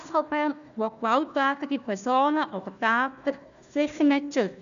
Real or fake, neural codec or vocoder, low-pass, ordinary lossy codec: fake; codec, 16 kHz, 1 kbps, FunCodec, trained on Chinese and English, 50 frames a second; 7.2 kHz; none